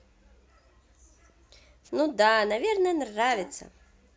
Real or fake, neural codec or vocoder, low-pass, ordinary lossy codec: real; none; none; none